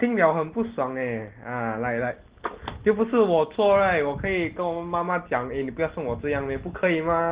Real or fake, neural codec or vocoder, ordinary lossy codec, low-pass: real; none; Opus, 16 kbps; 3.6 kHz